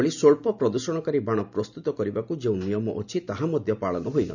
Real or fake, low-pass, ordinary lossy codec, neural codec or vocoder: real; 7.2 kHz; none; none